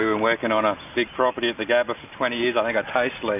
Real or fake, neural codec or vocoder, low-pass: real; none; 3.6 kHz